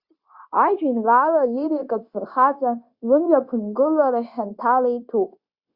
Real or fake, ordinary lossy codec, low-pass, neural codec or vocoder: fake; Opus, 64 kbps; 5.4 kHz; codec, 16 kHz, 0.9 kbps, LongCat-Audio-Codec